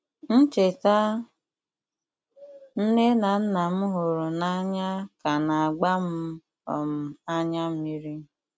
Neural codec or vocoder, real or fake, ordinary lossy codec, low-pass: none; real; none; none